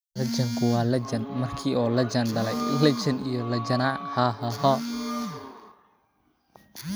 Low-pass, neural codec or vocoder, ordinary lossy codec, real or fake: none; none; none; real